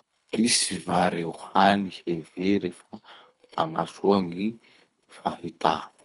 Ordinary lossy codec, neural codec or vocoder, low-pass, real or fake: none; codec, 24 kHz, 3 kbps, HILCodec; 10.8 kHz; fake